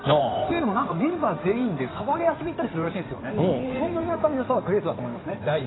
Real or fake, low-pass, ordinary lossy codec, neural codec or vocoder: fake; 7.2 kHz; AAC, 16 kbps; codec, 16 kHz in and 24 kHz out, 2.2 kbps, FireRedTTS-2 codec